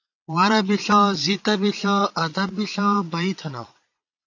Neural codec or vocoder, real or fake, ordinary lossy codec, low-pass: vocoder, 22.05 kHz, 80 mel bands, Vocos; fake; AAC, 48 kbps; 7.2 kHz